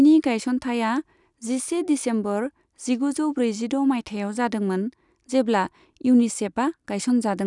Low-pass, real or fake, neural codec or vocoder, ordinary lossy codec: 10.8 kHz; real; none; none